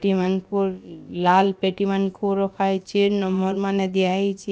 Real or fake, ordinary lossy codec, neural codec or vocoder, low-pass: fake; none; codec, 16 kHz, about 1 kbps, DyCAST, with the encoder's durations; none